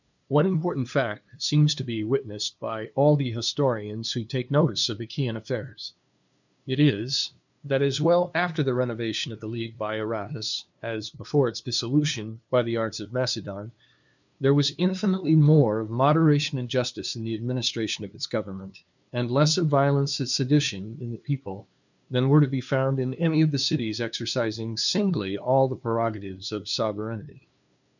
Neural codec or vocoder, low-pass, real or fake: codec, 16 kHz, 2 kbps, FunCodec, trained on LibriTTS, 25 frames a second; 7.2 kHz; fake